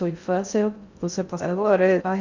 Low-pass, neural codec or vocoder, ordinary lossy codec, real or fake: 7.2 kHz; codec, 16 kHz in and 24 kHz out, 0.6 kbps, FocalCodec, streaming, 2048 codes; Opus, 64 kbps; fake